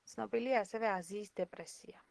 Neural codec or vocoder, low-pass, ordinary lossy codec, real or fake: none; 10.8 kHz; Opus, 16 kbps; real